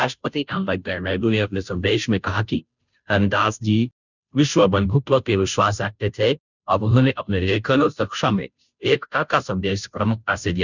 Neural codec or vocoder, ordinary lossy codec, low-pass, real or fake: codec, 16 kHz, 0.5 kbps, FunCodec, trained on Chinese and English, 25 frames a second; none; 7.2 kHz; fake